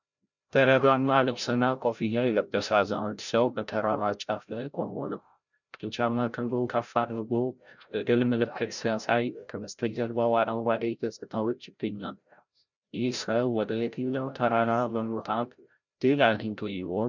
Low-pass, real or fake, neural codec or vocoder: 7.2 kHz; fake; codec, 16 kHz, 0.5 kbps, FreqCodec, larger model